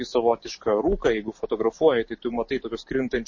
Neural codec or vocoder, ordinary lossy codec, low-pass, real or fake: none; MP3, 32 kbps; 7.2 kHz; real